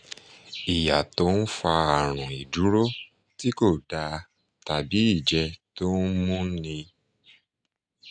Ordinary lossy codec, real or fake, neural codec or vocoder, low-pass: none; real; none; 9.9 kHz